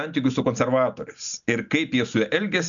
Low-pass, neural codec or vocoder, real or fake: 7.2 kHz; none; real